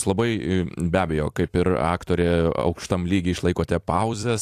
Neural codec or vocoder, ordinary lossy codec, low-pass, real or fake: vocoder, 44.1 kHz, 128 mel bands every 256 samples, BigVGAN v2; AAC, 64 kbps; 14.4 kHz; fake